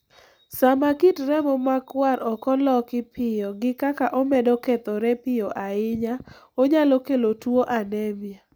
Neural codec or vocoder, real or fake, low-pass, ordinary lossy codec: none; real; none; none